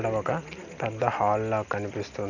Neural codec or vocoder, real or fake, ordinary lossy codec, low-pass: none; real; none; 7.2 kHz